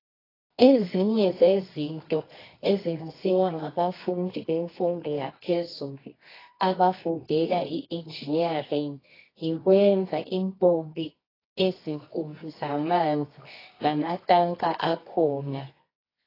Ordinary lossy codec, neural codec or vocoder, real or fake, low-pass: AAC, 24 kbps; codec, 24 kHz, 0.9 kbps, WavTokenizer, medium music audio release; fake; 5.4 kHz